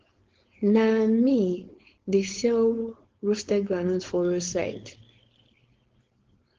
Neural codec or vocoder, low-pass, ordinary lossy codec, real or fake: codec, 16 kHz, 4.8 kbps, FACodec; 7.2 kHz; Opus, 16 kbps; fake